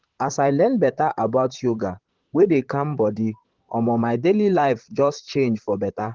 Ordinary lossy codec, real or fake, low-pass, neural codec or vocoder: Opus, 16 kbps; fake; 7.2 kHz; vocoder, 44.1 kHz, 128 mel bands, Pupu-Vocoder